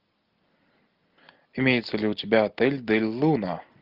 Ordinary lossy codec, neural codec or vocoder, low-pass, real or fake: Opus, 32 kbps; none; 5.4 kHz; real